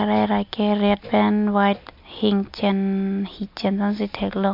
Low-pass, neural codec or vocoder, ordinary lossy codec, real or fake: 5.4 kHz; none; MP3, 48 kbps; real